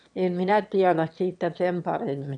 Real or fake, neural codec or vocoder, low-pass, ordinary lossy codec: fake; autoencoder, 22.05 kHz, a latent of 192 numbers a frame, VITS, trained on one speaker; 9.9 kHz; none